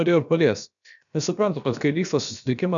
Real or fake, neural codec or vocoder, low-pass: fake; codec, 16 kHz, about 1 kbps, DyCAST, with the encoder's durations; 7.2 kHz